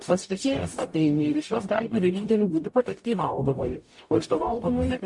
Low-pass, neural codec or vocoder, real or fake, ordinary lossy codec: 10.8 kHz; codec, 44.1 kHz, 0.9 kbps, DAC; fake; MP3, 48 kbps